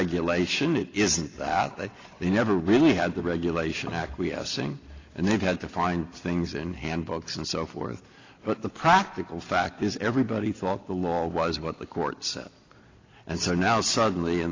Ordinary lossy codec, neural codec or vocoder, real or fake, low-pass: AAC, 32 kbps; none; real; 7.2 kHz